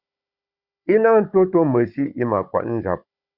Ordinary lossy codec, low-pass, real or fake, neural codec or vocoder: Opus, 64 kbps; 5.4 kHz; fake; codec, 16 kHz, 16 kbps, FunCodec, trained on Chinese and English, 50 frames a second